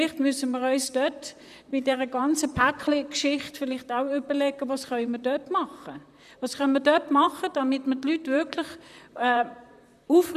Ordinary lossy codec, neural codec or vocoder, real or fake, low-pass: none; vocoder, 44.1 kHz, 128 mel bands, Pupu-Vocoder; fake; 14.4 kHz